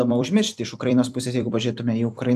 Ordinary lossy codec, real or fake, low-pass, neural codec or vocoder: AAC, 96 kbps; fake; 14.4 kHz; vocoder, 44.1 kHz, 128 mel bands every 256 samples, BigVGAN v2